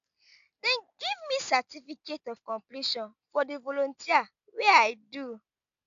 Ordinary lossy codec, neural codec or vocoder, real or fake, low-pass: none; none; real; 7.2 kHz